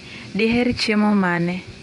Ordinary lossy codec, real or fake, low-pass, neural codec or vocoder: none; fake; 10.8 kHz; vocoder, 24 kHz, 100 mel bands, Vocos